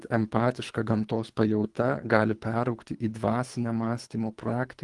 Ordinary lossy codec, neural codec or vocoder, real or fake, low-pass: Opus, 24 kbps; codec, 24 kHz, 3 kbps, HILCodec; fake; 10.8 kHz